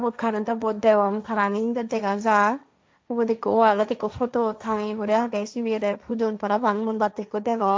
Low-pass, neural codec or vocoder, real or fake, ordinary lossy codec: none; codec, 16 kHz, 1.1 kbps, Voila-Tokenizer; fake; none